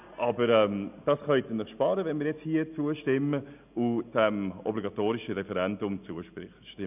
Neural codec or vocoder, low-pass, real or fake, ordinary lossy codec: none; 3.6 kHz; real; none